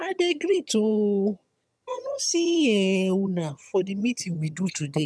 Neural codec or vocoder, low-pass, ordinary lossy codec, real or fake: vocoder, 22.05 kHz, 80 mel bands, HiFi-GAN; none; none; fake